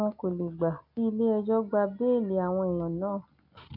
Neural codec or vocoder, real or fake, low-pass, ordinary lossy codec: none; real; 5.4 kHz; none